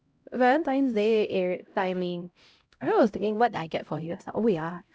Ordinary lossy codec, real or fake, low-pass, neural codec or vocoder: none; fake; none; codec, 16 kHz, 0.5 kbps, X-Codec, HuBERT features, trained on LibriSpeech